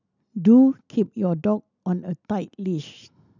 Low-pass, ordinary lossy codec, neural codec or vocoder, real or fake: 7.2 kHz; none; none; real